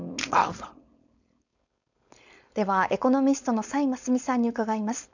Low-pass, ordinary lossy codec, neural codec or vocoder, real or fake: 7.2 kHz; none; codec, 16 kHz, 4.8 kbps, FACodec; fake